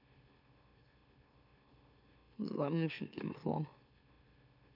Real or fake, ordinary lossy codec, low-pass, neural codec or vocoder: fake; none; 5.4 kHz; autoencoder, 44.1 kHz, a latent of 192 numbers a frame, MeloTTS